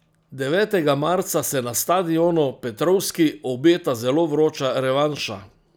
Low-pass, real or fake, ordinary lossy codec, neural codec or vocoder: none; real; none; none